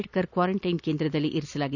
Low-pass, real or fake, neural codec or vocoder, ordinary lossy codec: 7.2 kHz; real; none; none